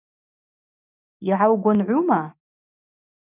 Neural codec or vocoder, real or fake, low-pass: none; real; 3.6 kHz